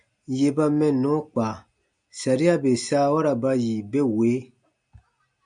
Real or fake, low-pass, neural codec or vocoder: real; 9.9 kHz; none